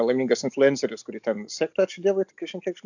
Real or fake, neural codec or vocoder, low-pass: real; none; 7.2 kHz